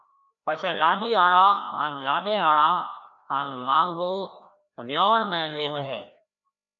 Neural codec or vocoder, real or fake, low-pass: codec, 16 kHz, 1 kbps, FreqCodec, larger model; fake; 7.2 kHz